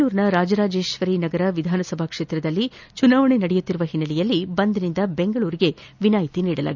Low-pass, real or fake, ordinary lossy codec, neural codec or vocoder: 7.2 kHz; real; none; none